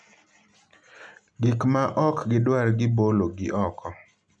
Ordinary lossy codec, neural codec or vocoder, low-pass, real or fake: none; none; 9.9 kHz; real